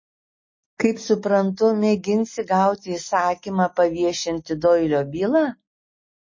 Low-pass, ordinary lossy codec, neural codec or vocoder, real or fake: 7.2 kHz; MP3, 32 kbps; none; real